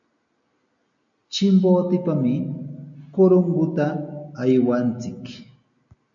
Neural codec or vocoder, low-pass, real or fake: none; 7.2 kHz; real